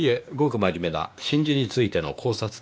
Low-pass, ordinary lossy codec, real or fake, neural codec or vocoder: none; none; fake; codec, 16 kHz, 2 kbps, X-Codec, WavLM features, trained on Multilingual LibriSpeech